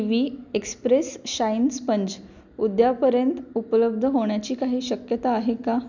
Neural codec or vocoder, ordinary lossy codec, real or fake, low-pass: none; none; real; 7.2 kHz